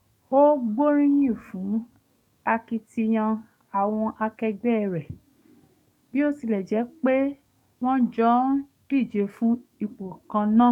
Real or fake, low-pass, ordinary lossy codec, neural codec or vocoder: fake; 19.8 kHz; none; codec, 44.1 kHz, 7.8 kbps, DAC